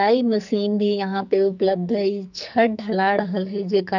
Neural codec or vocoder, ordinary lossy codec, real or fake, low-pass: codec, 44.1 kHz, 2.6 kbps, SNAC; none; fake; 7.2 kHz